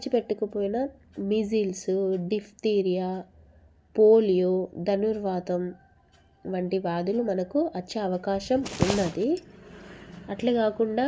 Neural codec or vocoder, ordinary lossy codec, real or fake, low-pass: none; none; real; none